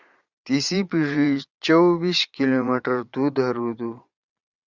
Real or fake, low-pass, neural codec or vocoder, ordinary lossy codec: fake; 7.2 kHz; vocoder, 22.05 kHz, 80 mel bands, Vocos; Opus, 64 kbps